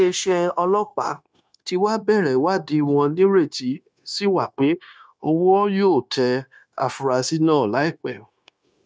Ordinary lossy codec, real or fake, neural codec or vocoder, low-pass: none; fake; codec, 16 kHz, 0.9 kbps, LongCat-Audio-Codec; none